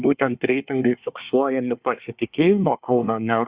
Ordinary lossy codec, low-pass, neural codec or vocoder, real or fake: Opus, 64 kbps; 3.6 kHz; codec, 24 kHz, 1 kbps, SNAC; fake